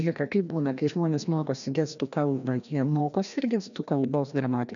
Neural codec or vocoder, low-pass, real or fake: codec, 16 kHz, 1 kbps, FreqCodec, larger model; 7.2 kHz; fake